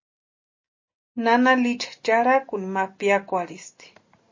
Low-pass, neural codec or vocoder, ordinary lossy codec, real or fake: 7.2 kHz; none; MP3, 32 kbps; real